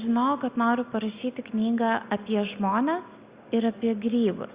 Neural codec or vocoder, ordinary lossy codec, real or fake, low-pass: none; Opus, 64 kbps; real; 3.6 kHz